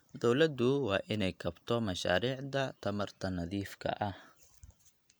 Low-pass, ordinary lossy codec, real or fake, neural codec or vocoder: none; none; real; none